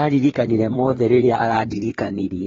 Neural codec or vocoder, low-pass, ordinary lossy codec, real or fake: codec, 16 kHz, 2 kbps, FreqCodec, larger model; 7.2 kHz; AAC, 24 kbps; fake